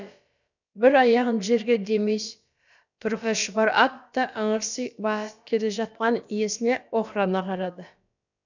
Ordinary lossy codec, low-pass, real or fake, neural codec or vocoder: none; 7.2 kHz; fake; codec, 16 kHz, about 1 kbps, DyCAST, with the encoder's durations